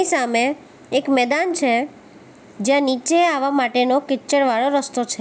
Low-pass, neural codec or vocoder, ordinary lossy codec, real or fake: none; none; none; real